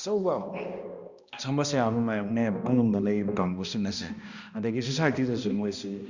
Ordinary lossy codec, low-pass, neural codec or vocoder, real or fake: Opus, 64 kbps; 7.2 kHz; codec, 16 kHz, 1 kbps, X-Codec, HuBERT features, trained on balanced general audio; fake